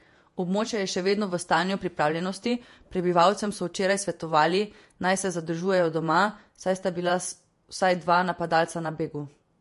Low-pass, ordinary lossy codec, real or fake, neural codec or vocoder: 14.4 kHz; MP3, 48 kbps; fake; vocoder, 48 kHz, 128 mel bands, Vocos